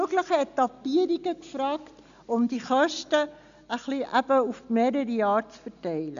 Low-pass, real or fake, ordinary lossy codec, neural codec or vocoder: 7.2 kHz; real; AAC, 96 kbps; none